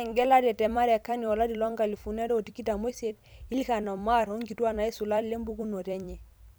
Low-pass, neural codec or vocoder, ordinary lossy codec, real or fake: none; vocoder, 44.1 kHz, 128 mel bands every 256 samples, BigVGAN v2; none; fake